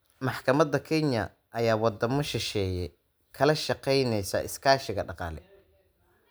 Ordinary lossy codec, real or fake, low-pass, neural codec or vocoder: none; real; none; none